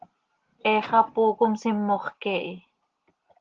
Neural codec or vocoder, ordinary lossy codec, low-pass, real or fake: none; Opus, 16 kbps; 7.2 kHz; real